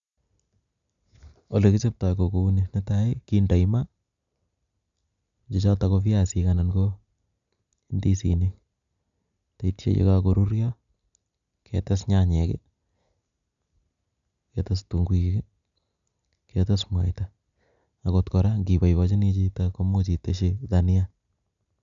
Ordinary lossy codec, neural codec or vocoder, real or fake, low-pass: none; none; real; 7.2 kHz